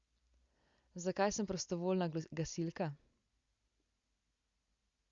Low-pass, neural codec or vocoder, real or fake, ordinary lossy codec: 7.2 kHz; none; real; Opus, 64 kbps